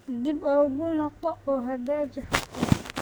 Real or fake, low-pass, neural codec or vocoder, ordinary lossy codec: fake; none; codec, 44.1 kHz, 2.6 kbps, SNAC; none